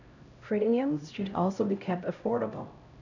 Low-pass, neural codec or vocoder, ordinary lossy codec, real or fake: 7.2 kHz; codec, 16 kHz, 0.5 kbps, X-Codec, HuBERT features, trained on LibriSpeech; none; fake